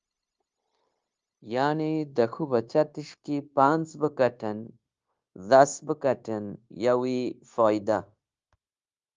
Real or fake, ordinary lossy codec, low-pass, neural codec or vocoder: fake; Opus, 32 kbps; 7.2 kHz; codec, 16 kHz, 0.9 kbps, LongCat-Audio-Codec